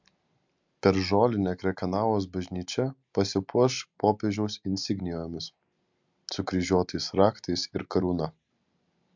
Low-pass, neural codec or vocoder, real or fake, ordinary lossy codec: 7.2 kHz; none; real; MP3, 64 kbps